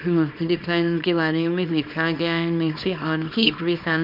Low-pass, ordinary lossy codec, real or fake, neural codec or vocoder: 5.4 kHz; none; fake; codec, 24 kHz, 0.9 kbps, WavTokenizer, small release